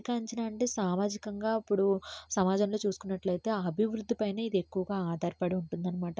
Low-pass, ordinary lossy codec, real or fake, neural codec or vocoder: none; none; real; none